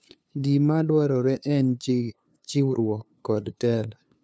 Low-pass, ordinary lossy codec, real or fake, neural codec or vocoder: none; none; fake; codec, 16 kHz, 2 kbps, FunCodec, trained on LibriTTS, 25 frames a second